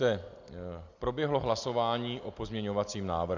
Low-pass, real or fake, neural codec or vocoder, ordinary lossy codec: 7.2 kHz; real; none; Opus, 64 kbps